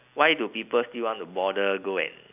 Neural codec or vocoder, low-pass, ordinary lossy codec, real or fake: none; 3.6 kHz; none; real